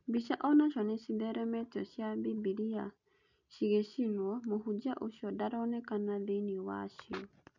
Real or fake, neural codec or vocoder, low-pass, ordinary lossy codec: real; none; 7.2 kHz; none